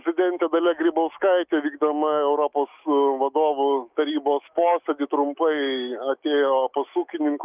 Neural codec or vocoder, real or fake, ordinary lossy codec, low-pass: autoencoder, 48 kHz, 128 numbers a frame, DAC-VAE, trained on Japanese speech; fake; Opus, 24 kbps; 3.6 kHz